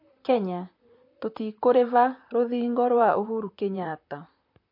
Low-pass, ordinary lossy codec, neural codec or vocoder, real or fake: 5.4 kHz; MP3, 32 kbps; vocoder, 44.1 kHz, 128 mel bands, Pupu-Vocoder; fake